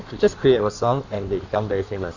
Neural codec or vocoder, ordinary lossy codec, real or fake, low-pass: codec, 16 kHz in and 24 kHz out, 1.1 kbps, FireRedTTS-2 codec; none; fake; 7.2 kHz